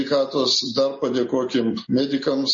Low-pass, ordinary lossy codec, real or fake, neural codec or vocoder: 7.2 kHz; MP3, 32 kbps; real; none